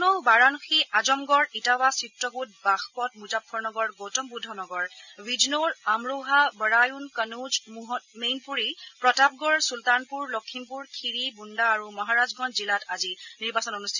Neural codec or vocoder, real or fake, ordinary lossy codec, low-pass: none; real; none; 7.2 kHz